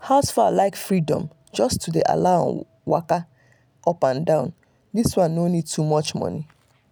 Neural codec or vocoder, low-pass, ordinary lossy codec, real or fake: none; none; none; real